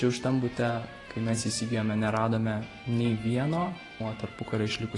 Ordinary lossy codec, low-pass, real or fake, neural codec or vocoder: AAC, 32 kbps; 10.8 kHz; fake; vocoder, 44.1 kHz, 128 mel bands every 512 samples, BigVGAN v2